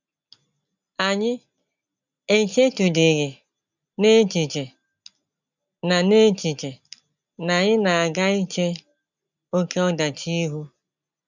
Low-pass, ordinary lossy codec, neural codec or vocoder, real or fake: 7.2 kHz; none; none; real